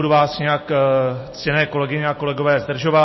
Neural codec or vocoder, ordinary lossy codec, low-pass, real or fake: none; MP3, 24 kbps; 7.2 kHz; real